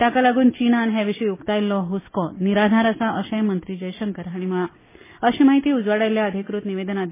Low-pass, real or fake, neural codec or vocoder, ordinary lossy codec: 3.6 kHz; real; none; MP3, 16 kbps